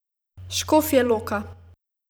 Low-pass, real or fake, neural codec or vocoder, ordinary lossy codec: none; fake; vocoder, 44.1 kHz, 128 mel bands every 512 samples, BigVGAN v2; none